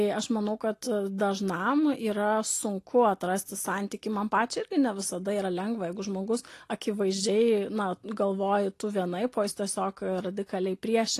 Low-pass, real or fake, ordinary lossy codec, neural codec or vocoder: 14.4 kHz; real; AAC, 48 kbps; none